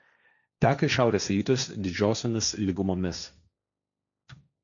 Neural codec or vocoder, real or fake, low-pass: codec, 16 kHz, 1.1 kbps, Voila-Tokenizer; fake; 7.2 kHz